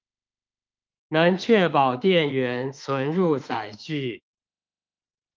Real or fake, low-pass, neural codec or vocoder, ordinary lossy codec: fake; 7.2 kHz; autoencoder, 48 kHz, 32 numbers a frame, DAC-VAE, trained on Japanese speech; Opus, 24 kbps